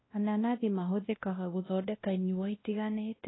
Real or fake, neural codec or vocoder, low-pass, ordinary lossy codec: fake; codec, 16 kHz, 1 kbps, X-Codec, WavLM features, trained on Multilingual LibriSpeech; 7.2 kHz; AAC, 16 kbps